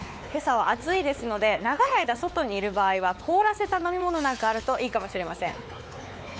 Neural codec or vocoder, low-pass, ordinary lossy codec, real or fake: codec, 16 kHz, 4 kbps, X-Codec, WavLM features, trained on Multilingual LibriSpeech; none; none; fake